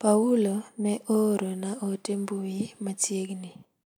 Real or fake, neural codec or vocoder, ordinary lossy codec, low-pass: real; none; none; none